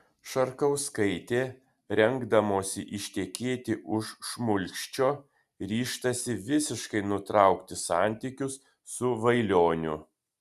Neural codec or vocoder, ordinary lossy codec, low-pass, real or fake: none; Opus, 64 kbps; 14.4 kHz; real